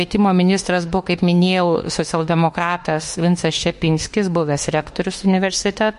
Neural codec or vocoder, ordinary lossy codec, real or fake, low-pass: autoencoder, 48 kHz, 32 numbers a frame, DAC-VAE, trained on Japanese speech; MP3, 48 kbps; fake; 14.4 kHz